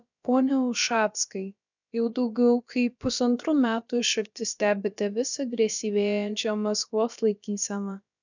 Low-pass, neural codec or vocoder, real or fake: 7.2 kHz; codec, 16 kHz, about 1 kbps, DyCAST, with the encoder's durations; fake